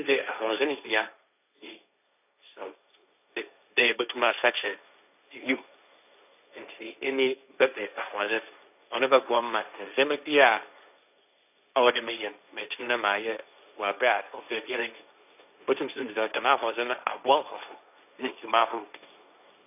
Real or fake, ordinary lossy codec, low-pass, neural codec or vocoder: fake; none; 3.6 kHz; codec, 16 kHz, 1.1 kbps, Voila-Tokenizer